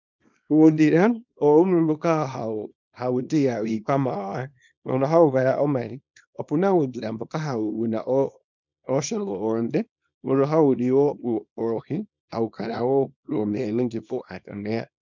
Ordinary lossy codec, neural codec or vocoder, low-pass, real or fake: MP3, 64 kbps; codec, 24 kHz, 0.9 kbps, WavTokenizer, small release; 7.2 kHz; fake